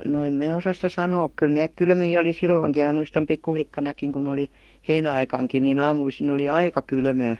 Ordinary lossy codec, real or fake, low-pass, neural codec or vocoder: Opus, 24 kbps; fake; 19.8 kHz; codec, 44.1 kHz, 2.6 kbps, DAC